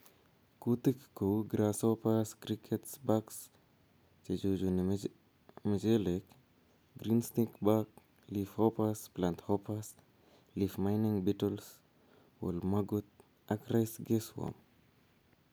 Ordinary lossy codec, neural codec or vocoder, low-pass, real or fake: none; none; none; real